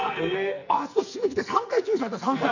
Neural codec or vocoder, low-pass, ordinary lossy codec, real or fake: codec, 44.1 kHz, 2.6 kbps, SNAC; 7.2 kHz; none; fake